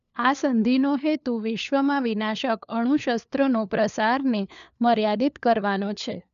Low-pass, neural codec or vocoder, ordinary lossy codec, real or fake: 7.2 kHz; codec, 16 kHz, 4 kbps, FunCodec, trained on LibriTTS, 50 frames a second; none; fake